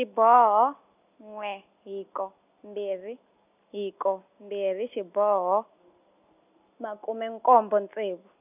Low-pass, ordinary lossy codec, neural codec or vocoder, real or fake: 3.6 kHz; none; none; real